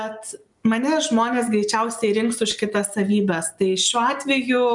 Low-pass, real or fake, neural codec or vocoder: 10.8 kHz; real; none